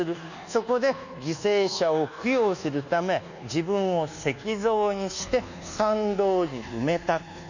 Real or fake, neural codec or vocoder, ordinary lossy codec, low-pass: fake; codec, 24 kHz, 1.2 kbps, DualCodec; none; 7.2 kHz